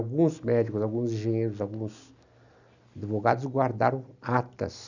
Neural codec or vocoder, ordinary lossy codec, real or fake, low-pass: none; none; real; 7.2 kHz